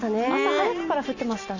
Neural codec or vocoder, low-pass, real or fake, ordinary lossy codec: none; 7.2 kHz; real; none